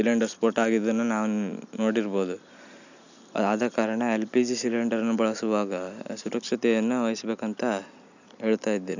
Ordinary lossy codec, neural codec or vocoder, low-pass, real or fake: none; none; 7.2 kHz; real